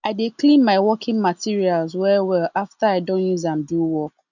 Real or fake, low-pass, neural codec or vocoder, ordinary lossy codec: real; 7.2 kHz; none; none